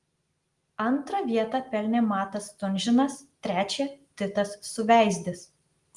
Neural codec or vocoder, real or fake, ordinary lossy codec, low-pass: none; real; Opus, 24 kbps; 10.8 kHz